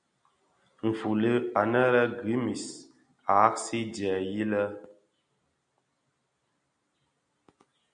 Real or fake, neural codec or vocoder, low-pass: real; none; 9.9 kHz